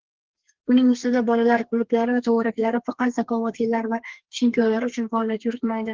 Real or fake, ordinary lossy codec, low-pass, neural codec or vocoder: fake; Opus, 16 kbps; 7.2 kHz; codec, 32 kHz, 1.9 kbps, SNAC